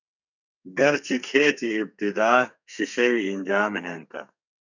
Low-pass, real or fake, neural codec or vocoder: 7.2 kHz; fake; codec, 32 kHz, 1.9 kbps, SNAC